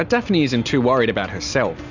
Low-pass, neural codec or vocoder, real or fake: 7.2 kHz; none; real